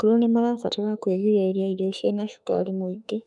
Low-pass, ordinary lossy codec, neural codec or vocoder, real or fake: 10.8 kHz; none; autoencoder, 48 kHz, 32 numbers a frame, DAC-VAE, trained on Japanese speech; fake